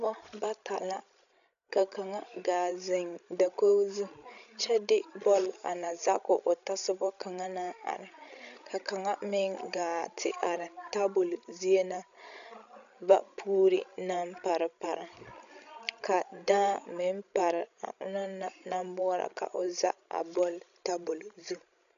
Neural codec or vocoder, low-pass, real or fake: codec, 16 kHz, 16 kbps, FreqCodec, larger model; 7.2 kHz; fake